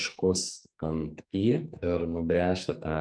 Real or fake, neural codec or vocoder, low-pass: fake; codec, 44.1 kHz, 2.6 kbps, SNAC; 9.9 kHz